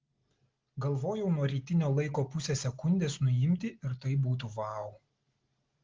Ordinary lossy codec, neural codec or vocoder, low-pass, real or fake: Opus, 16 kbps; none; 7.2 kHz; real